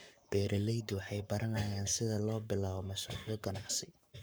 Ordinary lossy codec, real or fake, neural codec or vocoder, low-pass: none; fake; codec, 44.1 kHz, 7.8 kbps, Pupu-Codec; none